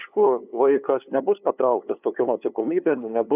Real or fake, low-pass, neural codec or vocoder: fake; 3.6 kHz; codec, 16 kHz, 2 kbps, FunCodec, trained on LibriTTS, 25 frames a second